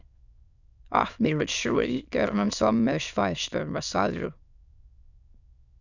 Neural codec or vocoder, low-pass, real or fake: autoencoder, 22.05 kHz, a latent of 192 numbers a frame, VITS, trained on many speakers; 7.2 kHz; fake